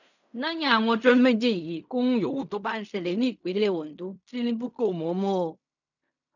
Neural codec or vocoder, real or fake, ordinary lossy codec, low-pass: codec, 16 kHz in and 24 kHz out, 0.4 kbps, LongCat-Audio-Codec, fine tuned four codebook decoder; fake; none; 7.2 kHz